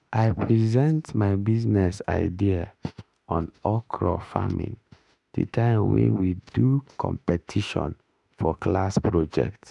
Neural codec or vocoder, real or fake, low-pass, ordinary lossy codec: autoencoder, 48 kHz, 32 numbers a frame, DAC-VAE, trained on Japanese speech; fake; 10.8 kHz; none